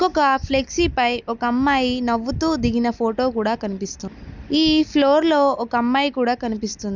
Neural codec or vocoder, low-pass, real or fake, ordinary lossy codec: none; 7.2 kHz; real; none